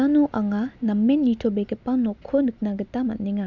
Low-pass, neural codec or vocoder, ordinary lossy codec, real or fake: 7.2 kHz; none; none; real